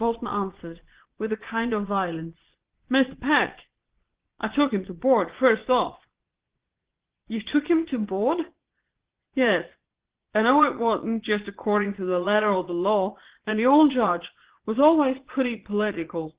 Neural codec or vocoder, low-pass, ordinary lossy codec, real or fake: vocoder, 22.05 kHz, 80 mel bands, Vocos; 3.6 kHz; Opus, 16 kbps; fake